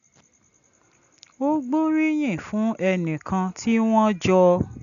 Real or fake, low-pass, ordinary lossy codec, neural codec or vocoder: real; 7.2 kHz; none; none